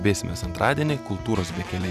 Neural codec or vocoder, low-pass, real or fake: none; 14.4 kHz; real